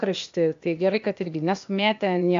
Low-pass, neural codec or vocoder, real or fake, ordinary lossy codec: 7.2 kHz; codec, 16 kHz, 0.8 kbps, ZipCodec; fake; AAC, 64 kbps